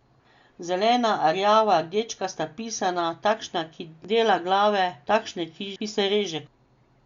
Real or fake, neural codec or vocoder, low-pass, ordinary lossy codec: real; none; 7.2 kHz; Opus, 64 kbps